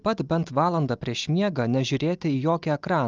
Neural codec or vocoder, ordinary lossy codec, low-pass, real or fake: none; Opus, 24 kbps; 7.2 kHz; real